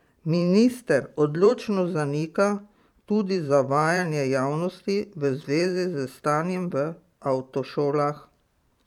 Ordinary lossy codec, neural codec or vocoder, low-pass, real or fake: none; vocoder, 44.1 kHz, 128 mel bands every 512 samples, BigVGAN v2; 19.8 kHz; fake